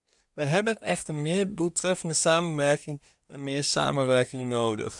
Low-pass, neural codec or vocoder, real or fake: 10.8 kHz; codec, 24 kHz, 1 kbps, SNAC; fake